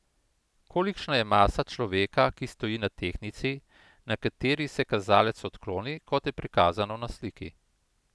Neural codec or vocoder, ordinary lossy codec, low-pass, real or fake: none; none; none; real